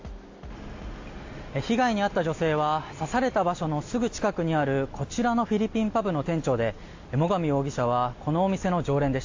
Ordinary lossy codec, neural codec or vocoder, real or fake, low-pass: AAC, 48 kbps; none; real; 7.2 kHz